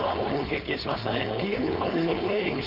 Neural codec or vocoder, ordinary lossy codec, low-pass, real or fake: codec, 16 kHz, 4.8 kbps, FACodec; none; 5.4 kHz; fake